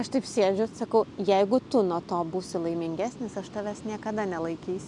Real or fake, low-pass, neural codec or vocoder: real; 10.8 kHz; none